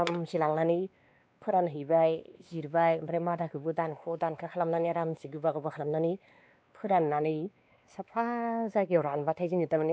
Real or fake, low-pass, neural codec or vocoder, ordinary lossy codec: fake; none; codec, 16 kHz, 2 kbps, X-Codec, WavLM features, trained on Multilingual LibriSpeech; none